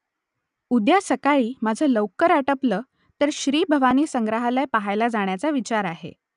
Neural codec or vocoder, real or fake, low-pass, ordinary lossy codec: none; real; 10.8 kHz; none